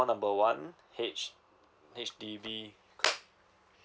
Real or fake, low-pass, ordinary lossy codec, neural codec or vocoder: real; none; none; none